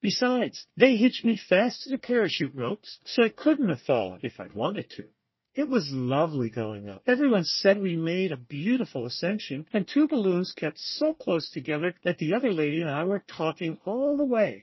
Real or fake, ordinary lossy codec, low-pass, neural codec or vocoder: fake; MP3, 24 kbps; 7.2 kHz; codec, 24 kHz, 1 kbps, SNAC